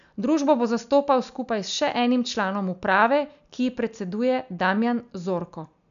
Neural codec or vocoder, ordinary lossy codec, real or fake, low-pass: none; none; real; 7.2 kHz